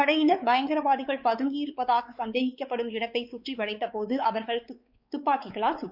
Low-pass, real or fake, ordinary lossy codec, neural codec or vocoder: 5.4 kHz; fake; Opus, 64 kbps; codec, 16 kHz, 4 kbps, X-Codec, WavLM features, trained on Multilingual LibriSpeech